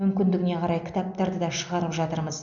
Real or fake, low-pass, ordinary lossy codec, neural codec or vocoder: real; 7.2 kHz; none; none